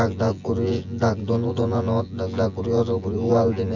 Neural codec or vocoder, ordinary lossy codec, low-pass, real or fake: vocoder, 24 kHz, 100 mel bands, Vocos; none; 7.2 kHz; fake